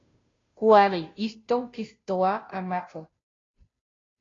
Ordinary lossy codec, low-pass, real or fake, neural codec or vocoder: AAC, 32 kbps; 7.2 kHz; fake; codec, 16 kHz, 0.5 kbps, FunCodec, trained on Chinese and English, 25 frames a second